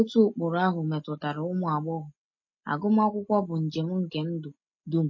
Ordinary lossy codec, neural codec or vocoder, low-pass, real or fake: MP3, 32 kbps; none; 7.2 kHz; real